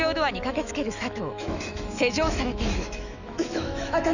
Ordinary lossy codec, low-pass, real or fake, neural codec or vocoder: none; 7.2 kHz; fake; autoencoder, 48 kHz, 128 numbers a frame, DAC-VAE, trained on Japanese speech